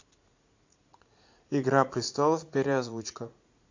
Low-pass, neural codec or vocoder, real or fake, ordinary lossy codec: 7.2 kHz; vocoder, 44.1 kHz, 80 mel bands, Vocos; fake; AAC, 48 kbps